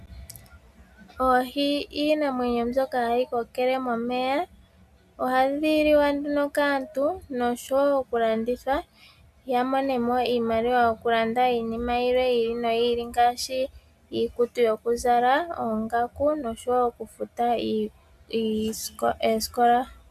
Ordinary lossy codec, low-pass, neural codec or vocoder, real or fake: MP3, 96 kbps; 14.4 kHz; none; real